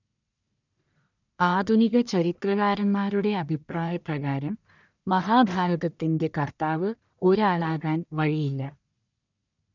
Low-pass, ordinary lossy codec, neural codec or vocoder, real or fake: 7.2 kHz; none; codec, 44.1 kHz, 1.7 kbps, Pupu-Codec; fake